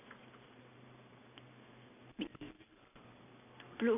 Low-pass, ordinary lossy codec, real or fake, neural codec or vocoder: 3.6 kHz; none; real; none